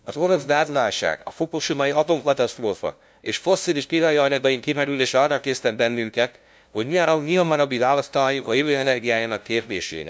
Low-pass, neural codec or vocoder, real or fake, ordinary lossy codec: none; codec, 16 kHz, 0.5 kbps, FunCodec, trained on LibriTTS, 25 frames a second; fake; none